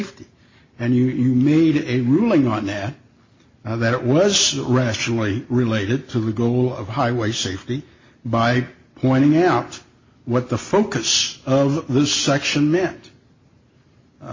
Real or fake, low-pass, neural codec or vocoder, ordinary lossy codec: real; 7.2 kHz; none; MP3, 32 kbps